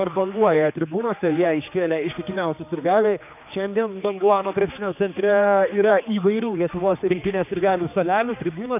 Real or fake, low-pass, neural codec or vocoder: fake; 3.6 kHz; codec, 16 kHz, 2 kbps, X-Codec, HuBERT features, trained on general audio